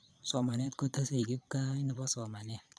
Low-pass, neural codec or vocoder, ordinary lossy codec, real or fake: none; vocoder, 22.05 kHz, 80 mel bands, Vocos; none; fake